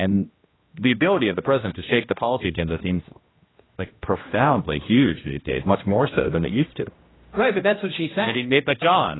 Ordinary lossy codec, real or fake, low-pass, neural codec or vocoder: AAC, 16 kbps; fake; 7.2 kHz; codec, 16 kHz, 1 kbps, X-Codec, HuBERT features, trained on general audio